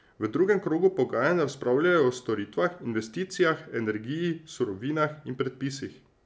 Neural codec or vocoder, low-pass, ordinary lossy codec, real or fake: none; none; none; real